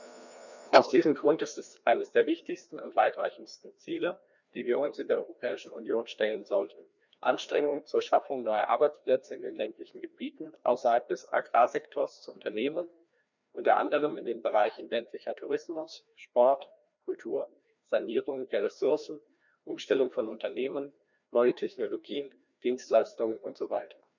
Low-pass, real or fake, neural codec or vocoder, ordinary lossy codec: 7.2 kHz; fake; codec, 16 kHz, 1 kbps, FreqCodec, larger model; none